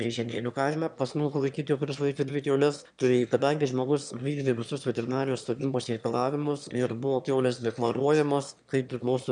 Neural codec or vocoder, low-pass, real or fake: autoencoder, 22.05 kHz, a latent of 192 numbers a frame, VITS, trained on one speaker; 9.9 kHz; fake